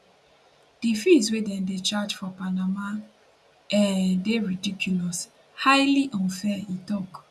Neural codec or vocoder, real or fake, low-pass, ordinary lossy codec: none; real; none; none